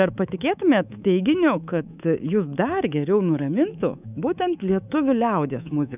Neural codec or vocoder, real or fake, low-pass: codec, 24 kHz, 3.1 kbps, DualCodec; fake; 3.6 kHz